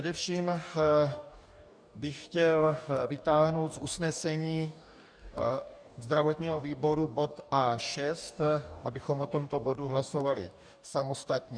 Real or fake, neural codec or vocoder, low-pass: fake; codec, 44.1 kHz, 2.6 kbps, DAC; 9.9 kHz